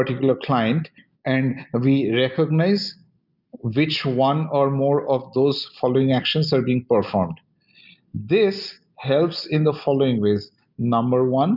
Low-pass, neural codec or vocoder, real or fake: 5.4 kHz; none; real